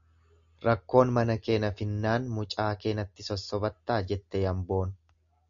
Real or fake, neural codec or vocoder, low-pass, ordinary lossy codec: real; none; 7.2 kHz; MP3, 96 kbps